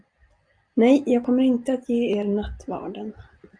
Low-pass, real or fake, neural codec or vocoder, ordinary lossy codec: 9.9 kHz; real; none; Opus, 64 kbps